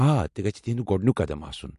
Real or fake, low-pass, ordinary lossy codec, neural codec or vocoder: real; 14.4 kHz; MP3, 48 kbps; none